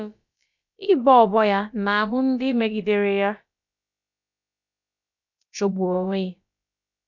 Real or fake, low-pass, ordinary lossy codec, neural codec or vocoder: fake; 7.2 kHz; none; codec, 16 kHz, about 1 kbps, DyCAST, with the encoder's durations